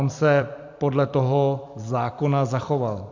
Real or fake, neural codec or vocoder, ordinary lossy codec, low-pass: fake; vocoder, 44.1 kHz, 128 mel bands every 256 samples, BigVGAN v2; MP3, 64 kbps; 7.2 kHz